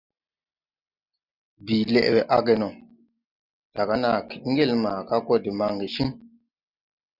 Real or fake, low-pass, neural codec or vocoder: real; 5.4 kHz; none